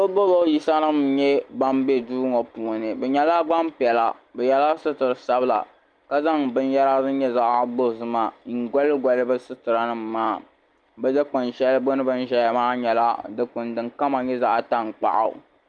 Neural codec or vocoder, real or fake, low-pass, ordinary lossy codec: none; real; 9.9 kHz; Opus, 32 kbps